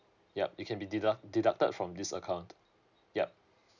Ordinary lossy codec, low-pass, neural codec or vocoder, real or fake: none; 7.2 kHz; none; real